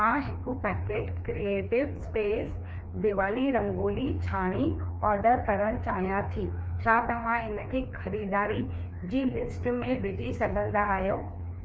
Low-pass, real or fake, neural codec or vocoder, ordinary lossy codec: none; fake; codec, 16 kHz, 2 kbps, FreqCodec, larger model; none